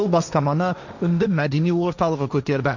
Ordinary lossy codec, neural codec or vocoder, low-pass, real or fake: none; codec, 16 kHz, 1.1 kbps, Voila-Tokenizer; 7.2 kHz; fake